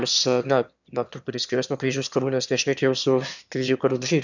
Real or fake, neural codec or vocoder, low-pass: fake; autoencoder, 22.05 kHz, a latent of 192 numbers a frame, VITS, trained on one speaker; 7.2 kHz